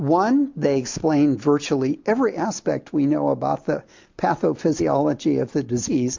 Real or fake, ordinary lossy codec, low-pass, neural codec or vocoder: real; MP3, 48 kbps; 7.2 kHz; none